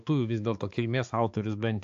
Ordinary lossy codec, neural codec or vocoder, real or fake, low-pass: AAC, 64 kbps; codec, 16 kHz, 4 kbps, X-Codec, HuBERT features, trained on balanced general audio; fake; 7.2 kHz